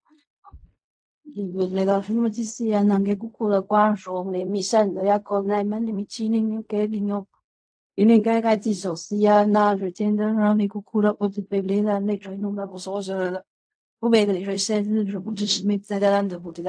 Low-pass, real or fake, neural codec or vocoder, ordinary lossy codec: 9.9 kHz; fake; codec, 16 kHz in and 24 kHz out, 0.4 kbps, LongCat-Audio-Codec, fine tuned four codebook decoder; AAC, 64 kbps